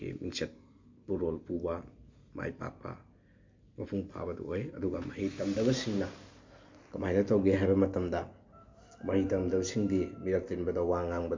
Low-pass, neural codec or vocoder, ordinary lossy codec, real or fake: 7.2 kHz; none; MP3, 48 kbps; real